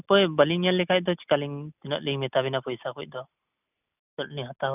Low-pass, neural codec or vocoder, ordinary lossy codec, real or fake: 3.6 kHz; none; none; real